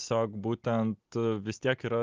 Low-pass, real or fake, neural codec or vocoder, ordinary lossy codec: 7.2 kHz; real; none; Opus, 24 kbps